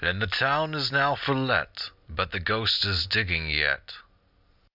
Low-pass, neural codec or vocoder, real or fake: 5.4 kHz; none; real